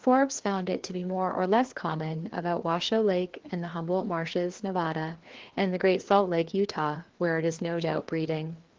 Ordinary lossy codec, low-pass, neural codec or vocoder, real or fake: Opus, 16 kbps; 7.2 kHz; codec, 16 kHz, 2 kbps, FreqCodec, larger model; fake